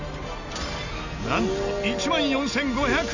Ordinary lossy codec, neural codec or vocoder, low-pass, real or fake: none; none; 7.2 kHz; real